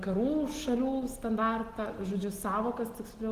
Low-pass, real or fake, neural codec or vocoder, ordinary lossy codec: 14.4 kHz; real; none; Opus, 16 kbps